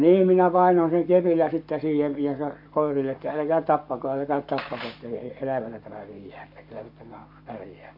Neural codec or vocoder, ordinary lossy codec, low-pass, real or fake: codec, 44.1 kHz, 7.8 kbps, Pupu-Codec; MP3, 48 kbps; 5.4 kHz; fake